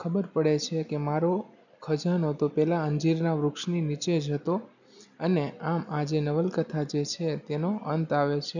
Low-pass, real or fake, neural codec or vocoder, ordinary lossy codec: 7.2 kHz; real; none; none